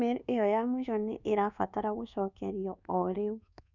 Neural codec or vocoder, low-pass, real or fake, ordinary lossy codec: codec, 24 kHz, 1.2 kbps, DualCodec; 7.2 kHz; fake; none